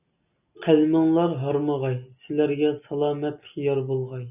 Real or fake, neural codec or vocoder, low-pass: real; none; 3.6 kHz